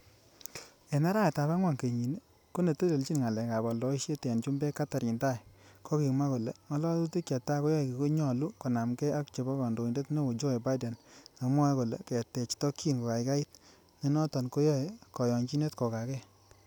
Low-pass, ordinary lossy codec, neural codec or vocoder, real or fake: none; none; none; real